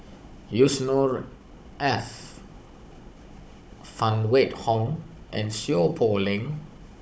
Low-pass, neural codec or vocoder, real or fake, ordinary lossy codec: none; codec, 16 kHz, 16 kbps, FunCodec, trained on Chinese and English, 50 frames a second; fake; none